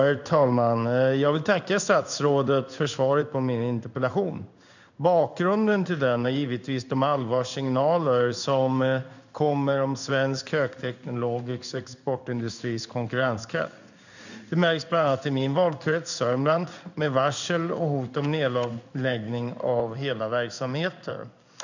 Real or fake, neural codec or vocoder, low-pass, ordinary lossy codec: fake; codec, 16 kHz in and 24 kHz out, 1 kbps, XY-Tokenizer; 7.2 kHz; none